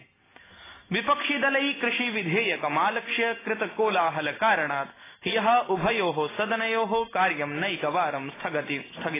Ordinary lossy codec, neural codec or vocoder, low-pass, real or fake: AAC, 16 kbps; none; 3.6 kHz; real